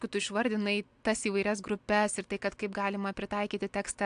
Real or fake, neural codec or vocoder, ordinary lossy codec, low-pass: real; none; AAC, 64 kbps; 9.9 kHz